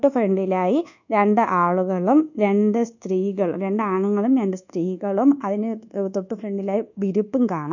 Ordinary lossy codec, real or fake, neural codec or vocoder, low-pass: none; fake; codec, 24 kHz, 1.2 kbps, DualCodec; 7.2 kHz